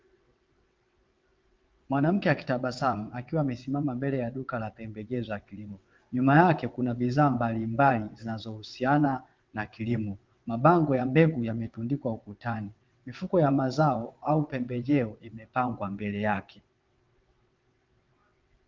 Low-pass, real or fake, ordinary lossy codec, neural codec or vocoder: 7.2 kHz; fake; Opus, 32 kbps; vocoder, 22.05 kHz, 80 mel bands, WaveNeXt